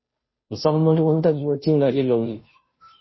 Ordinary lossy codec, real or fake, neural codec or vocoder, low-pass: MP3, 24 kbps; fake; codec, 16 kHz, 0.5 kbps, FunCodec, trained on Chinese and English, 25 frames a second; 7.2 kHz